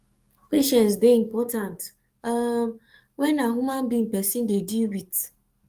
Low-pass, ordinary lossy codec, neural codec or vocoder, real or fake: 14.4 kHz; Opus, 32 kbps; codec, 44.1 kHz, 7.8 kbps, DAC; fake